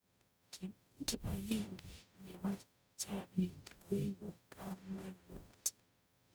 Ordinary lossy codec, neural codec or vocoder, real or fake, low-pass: none; codec, 44.1 kHz, 0.9 kbps, DAC; fake; none